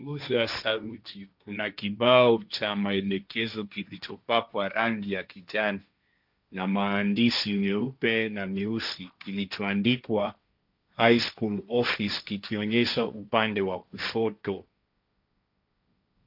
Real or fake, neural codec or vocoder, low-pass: fake; codec, 16 kHz, 1.1 kbps, Voila-Tokenizer; 5.4 kHz